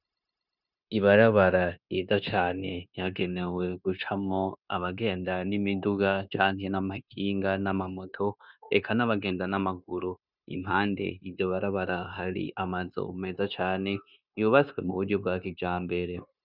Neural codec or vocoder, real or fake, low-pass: codec, 16 kHz, 0.9 kbps, LongCat-Audio-Codec; fake; 5.4 kHz